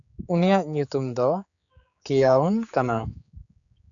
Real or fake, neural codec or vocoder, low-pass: fake; codec, 16 kHz, 4 kbps, X-Codec, HuBERT features, trained on general audio; 7.2 kHz